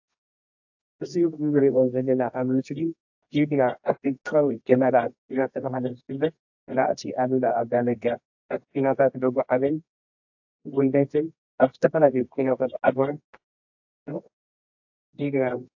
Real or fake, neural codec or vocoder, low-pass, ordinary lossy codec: fake; codec, 24 kHz, 0.9 kbps, WavTokenizer, medium music audio release; 7.2 kHz; AAC, 48 kbps